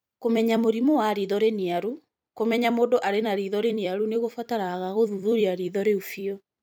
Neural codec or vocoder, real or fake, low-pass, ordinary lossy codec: vocoder, 44.1 kHz, 128 mel bands every 256 samples, BigVGAN v2; fake; none; none